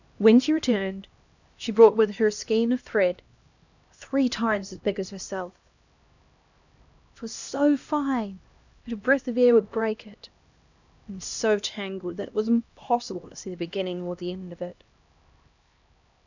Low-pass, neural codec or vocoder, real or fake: 7.2 kHz; codec, 16 kHz, 1 kbps, X-Codec, HuBERT features, trained on LibriSpeech; fake